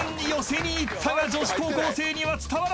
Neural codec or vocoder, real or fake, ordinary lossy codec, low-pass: none; real; none; none